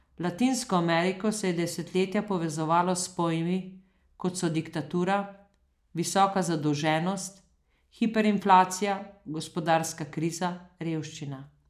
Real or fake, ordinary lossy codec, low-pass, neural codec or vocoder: real; none; 14.4 kHz; none